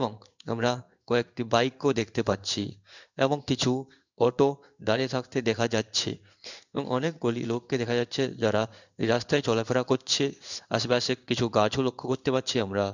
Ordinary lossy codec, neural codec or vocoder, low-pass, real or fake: none; codec, 16 kHz in and 24 kHz out, 1 kbps, XY-Tokenizer; 7.2 kHz; fake